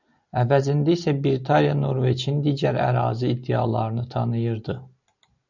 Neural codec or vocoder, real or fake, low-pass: none; real; 7.2 kHz